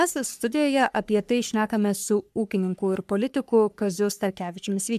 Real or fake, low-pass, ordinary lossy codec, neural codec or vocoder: fake; 14.4 kHz; MP3, 96 kbps; codec, 44.1 kHz, 3.4 kbps, Pupu-Codec